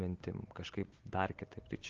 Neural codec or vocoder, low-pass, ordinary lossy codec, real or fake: none; 7.2 kHz; Opus, 16 kbps; real